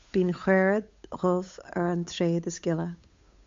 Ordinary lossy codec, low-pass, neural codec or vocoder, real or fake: MP3, 48 kbps; 7.2 kHz; codec, 16 kHz, 8 kbps, FunCodec, trained on Chinese and English, 25 frames a second; fake